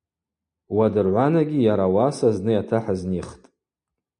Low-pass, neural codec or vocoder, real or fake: 9.9 kHz; none; real